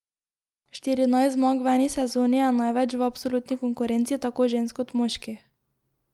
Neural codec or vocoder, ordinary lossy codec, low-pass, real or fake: none; Opus, 32 kbps; 19.8 kHz; real